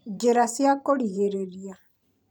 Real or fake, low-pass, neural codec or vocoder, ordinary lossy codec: fake; none; vocoder, 44.1 kHz, 128 mel bands every 512 samples, BigVGAN v2; none